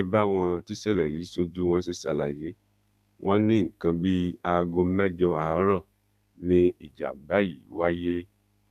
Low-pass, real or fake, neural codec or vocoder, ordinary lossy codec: 14.4 kHz; fake; codec, 32 kHz, 1.9 kbps, SNAC; none